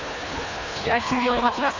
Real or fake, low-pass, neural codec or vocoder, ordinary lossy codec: fake; 7.2 kHz; codec, 24 kHz, 1.5 kbps, HILCodec; AAC, 32 kbps